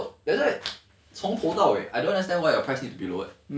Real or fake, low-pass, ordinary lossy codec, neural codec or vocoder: real; none; none; none